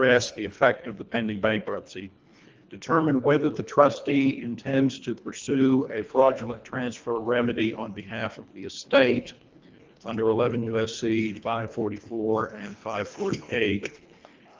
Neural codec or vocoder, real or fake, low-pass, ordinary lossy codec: codec, 24 kHz, 1.5 kbps, HILCodec; fake; 7.2 kHz; Opus, 24 kbps